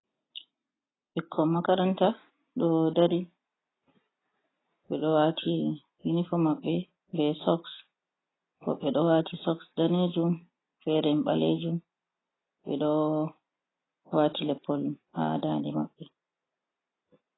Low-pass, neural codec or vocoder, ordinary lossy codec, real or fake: 7.2 kHz; none; AAC, 16 kbps; real